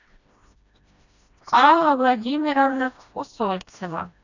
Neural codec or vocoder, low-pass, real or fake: codec, 16 kHz, 1 kbps, FreqCodec, smaller model; 7.2 kHz; fake